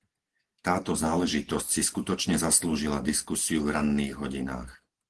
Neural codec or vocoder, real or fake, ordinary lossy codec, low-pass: none; real; Opus, 16 kbps; 10.8 kHz